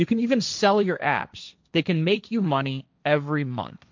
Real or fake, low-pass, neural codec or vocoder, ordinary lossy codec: fake; 7.2 kHz; codec, 16 kHz, 1.1 kbps, Voila-Tokenizer; MP3, 64 kbps